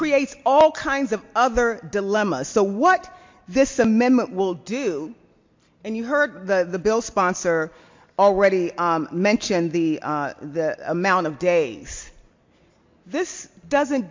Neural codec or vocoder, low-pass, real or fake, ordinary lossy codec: none; 7.2 kHz; real; MP3, 48 kbps